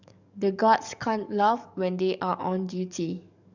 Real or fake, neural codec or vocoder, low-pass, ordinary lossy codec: fake; codec, 44.1 kHz, 7.8 kbps, DAC; 7.2 kHz; none